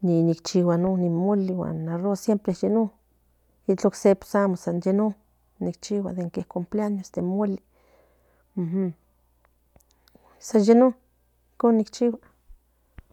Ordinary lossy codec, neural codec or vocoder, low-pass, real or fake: none; none; 19.8 kHz; real